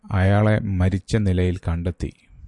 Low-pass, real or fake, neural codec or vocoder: 10.8 kHz; real; none